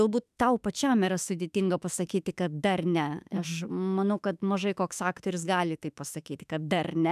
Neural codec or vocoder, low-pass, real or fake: autoencoder, 48 kHz, 32 numbers a frame, DAC-VAE, trained on Japanese speech; 14.4 kHz; fake